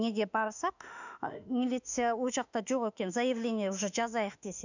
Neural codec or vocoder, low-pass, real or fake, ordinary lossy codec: autoencoder, 48 kHz, 32 numbers a frame, DAC-VAE, trained on Japanese speech; 7.2 kHz; fake; none